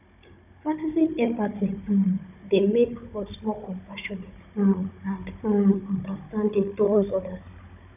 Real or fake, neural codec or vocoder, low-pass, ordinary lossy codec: fake; codec, 16 kHz, 16 kbps, FunCodec, trained on Chinese and English, 50 frames a second; 3.6 kHz; none